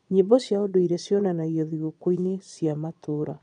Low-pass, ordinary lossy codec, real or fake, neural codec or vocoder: 9.9 kHz; none; fake; vocoder, 22.05 kHz, 80 mel bands, Vocos